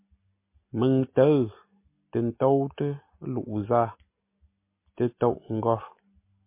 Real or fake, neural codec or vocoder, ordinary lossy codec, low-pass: real; none; MP3, 24 kbps; 3.6 kHz